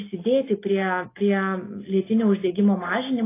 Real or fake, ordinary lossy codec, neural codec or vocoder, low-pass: real; AAC, 16 kbps; none; 3.6 kHz